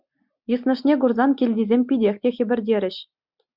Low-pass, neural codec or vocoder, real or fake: 5.4 kHz; none; real